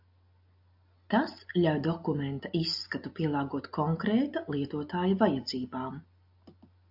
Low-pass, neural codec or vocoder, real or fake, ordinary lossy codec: 5.4 kHz; none; real; AAC, 48 kbps